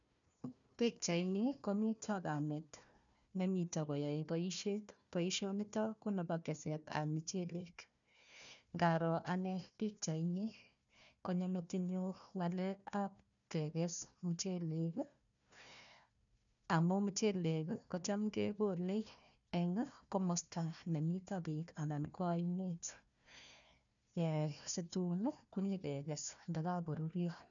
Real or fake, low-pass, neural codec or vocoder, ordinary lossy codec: fake; 7.2 kHz; codec, 16 kHz, 1 kbps, FunCodec, trained on Chinese and English, 50 frames a second; none